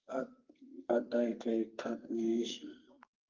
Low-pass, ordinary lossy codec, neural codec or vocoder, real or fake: 7.2 kHz; Opus, 24 kbps; codec, 32 kHz, 1.9 kbps, SNAC; fake